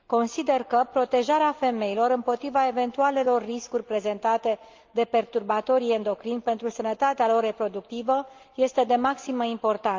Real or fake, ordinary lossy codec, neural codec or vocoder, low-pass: real; Opus, 24 kbps; none; 7.2 kHz